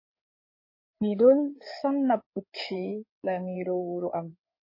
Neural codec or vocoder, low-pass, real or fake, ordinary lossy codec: codec, 16 kHz in and 24 kHz out, 2.2 kbps, FireRedTTS-2 codec; 5.4 kHz; fake; MP3, 24 kbps